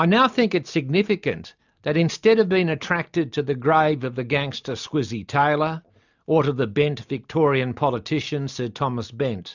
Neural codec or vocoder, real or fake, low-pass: none; real; 7.2 kHz